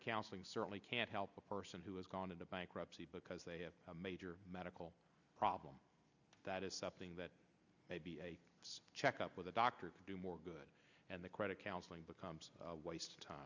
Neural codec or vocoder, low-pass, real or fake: none; 7.2 kHz; real